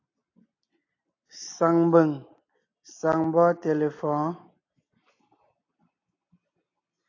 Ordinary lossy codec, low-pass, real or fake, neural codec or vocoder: AAC, 48 kbps; 7.2 kHz; real; none